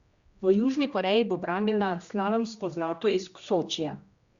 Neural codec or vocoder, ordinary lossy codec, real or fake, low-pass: codec, 16 kHz, 1 kbps, X-Codec, HuBERT features, trained on general audio; Opus, 64 kbps; fake; 7.2 kHz